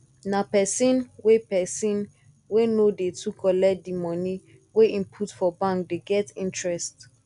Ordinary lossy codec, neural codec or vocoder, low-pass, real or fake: none; none; 10.8 kHz; real